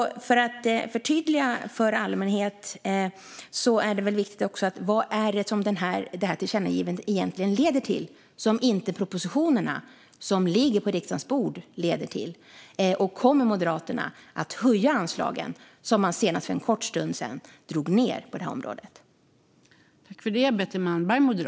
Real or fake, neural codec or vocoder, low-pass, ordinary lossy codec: real; none; none; none